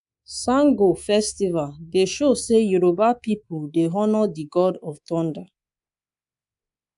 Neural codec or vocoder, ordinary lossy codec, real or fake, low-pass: codec, 24 kHz, 3.1 kbps, DualCodec; none; fake; 10.8 kHz